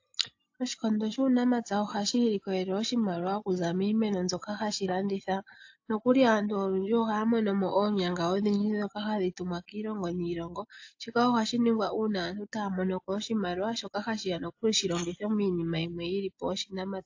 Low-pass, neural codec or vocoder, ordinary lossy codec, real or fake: 7.2 kHz; none; AAC, 48 kbps; real